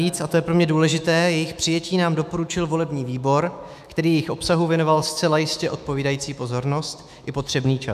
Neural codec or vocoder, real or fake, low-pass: autoencoder, 48 kHz, 128 numbers a frame, DAC-VAE, trained on Japanese speech; fake; 14.4 kHz